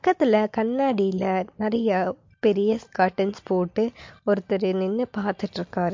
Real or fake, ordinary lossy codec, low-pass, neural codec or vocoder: real; MP3, 48 kbps; 7.2 kHz; none